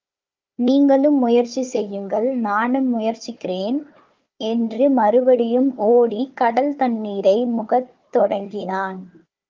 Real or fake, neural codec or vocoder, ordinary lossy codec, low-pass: fake; codec, 16 kHz, 4 kbps, FunCodec, trained on Chinese and English, 50 frames a second; Opus, 32 kbps; 7.2 kHz